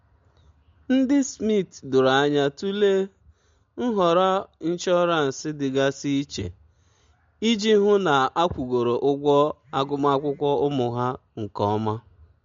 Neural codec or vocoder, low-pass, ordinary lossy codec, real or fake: none; 7.2 kHz; MP3, 48 kbps; real